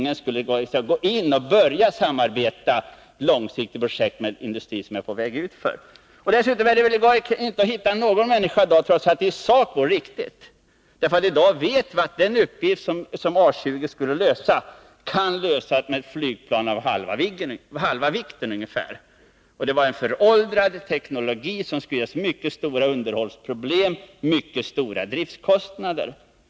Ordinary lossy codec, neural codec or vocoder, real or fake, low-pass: none; none; real; none